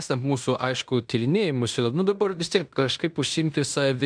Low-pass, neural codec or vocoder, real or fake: 9.9 kHz; codec, 16 kHz in and 24 kHz out, 0.9 kbps, LongCat-Audio-Codec, fine tuned four codebook decoder; fake